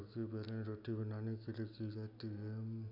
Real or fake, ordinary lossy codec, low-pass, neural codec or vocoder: real; none; 5.4 kHz; none